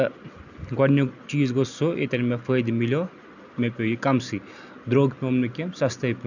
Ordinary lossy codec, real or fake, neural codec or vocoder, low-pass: none; real; none; 7.2 kHz